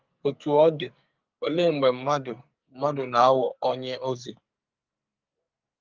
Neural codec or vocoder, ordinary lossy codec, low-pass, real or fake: codec, 44.1 kHz, 2.6 kbps, SNAC; Opus, 24 kbps; 7.2 kHz; fake